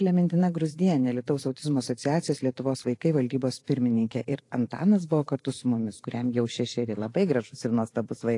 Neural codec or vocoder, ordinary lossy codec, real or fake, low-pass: vocoder, 44.1 kHz, 128 mel bands every 512 samples, BigVGAN v2; AAC, 48 kbps; fake; 10.8 kHz